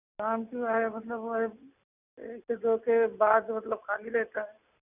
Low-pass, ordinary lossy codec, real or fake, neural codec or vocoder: 3.6 kHz; none; real; none